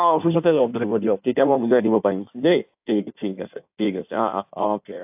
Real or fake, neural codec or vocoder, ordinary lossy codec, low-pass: fake; codec, 16 kHz in and 24 kHz out, 1.1 kbps, FireRedTTS-2 codec; AAC, 32 kbps; 3.6 kHz